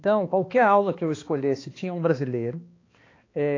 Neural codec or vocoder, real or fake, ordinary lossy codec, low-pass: codec, 16 kHz, 2 kbps, X-Codec, HuBERT features, trained on balanced general audio; fake; AAC, 32 kbps; 7.2 kHz